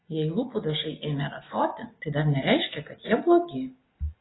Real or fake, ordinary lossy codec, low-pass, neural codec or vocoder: real; AAC, 16 kbps; 7.2 kHz; none